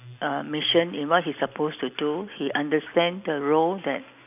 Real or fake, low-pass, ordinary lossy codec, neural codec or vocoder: real; 3.6 kHz; none; none